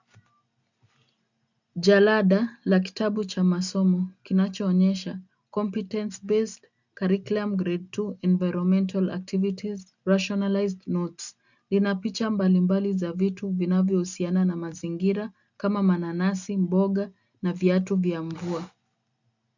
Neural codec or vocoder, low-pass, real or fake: none; 7.2 kHz; real